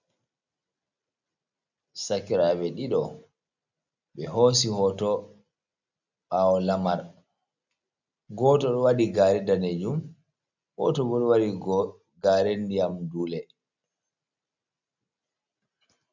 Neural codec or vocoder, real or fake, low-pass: none; real; 7.2 kHz